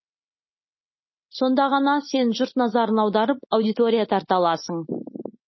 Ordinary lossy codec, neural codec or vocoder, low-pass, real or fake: MP3, 24 kbps; none; 7.2 kHz; real